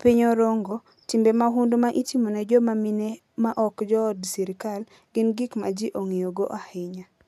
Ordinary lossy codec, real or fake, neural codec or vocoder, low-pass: none; real; none; 14.4 kHz